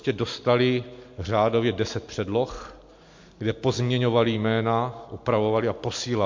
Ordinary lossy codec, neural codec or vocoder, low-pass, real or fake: MP3, 48 kbps; none; 7.2 kHz; real